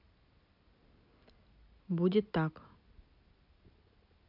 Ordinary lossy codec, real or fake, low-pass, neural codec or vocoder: none; real; 5.4 kHz; none